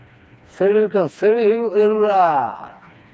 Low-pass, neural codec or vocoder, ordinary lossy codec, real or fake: none; codec, 16 kHz, 2 kbps, FreqCodec, smaller model; none; fake